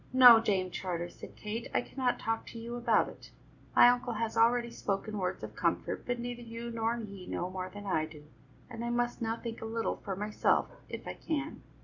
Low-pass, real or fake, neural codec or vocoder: 7.2 kHz; real; none